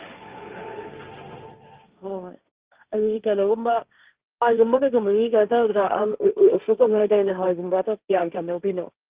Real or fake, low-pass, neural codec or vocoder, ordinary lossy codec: fake; 3.6 kHz; codec, 16 kHz, 1.1 kbps, Voila-Tokenizer; Opus, 32 kbps